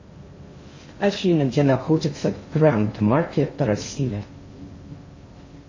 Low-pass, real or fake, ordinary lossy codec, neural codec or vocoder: 7.2 kHz; fake; MP3, 32 kbps; codec, 16 kHz in and 24 kHz out, 0.6 kbps, FocalCodec, streaming, 2048 codes